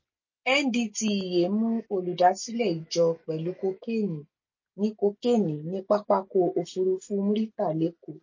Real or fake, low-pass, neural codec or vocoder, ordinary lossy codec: real; 7.2 kHz; none; MP3, 32 kbps